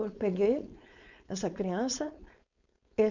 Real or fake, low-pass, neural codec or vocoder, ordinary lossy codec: fake; 7.2 kHz; codec, 16 kHz, 4.8 kbps, FACodec; none